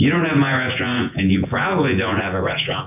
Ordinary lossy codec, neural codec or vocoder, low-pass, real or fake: AAC, 32 kbps; vocoder, 24 kHz, 100 mel bands, Vocos; 3.6 kHz; fake